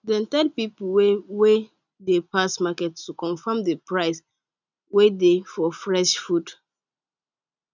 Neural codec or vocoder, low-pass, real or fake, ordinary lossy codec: none; 7.2 kHz; real; none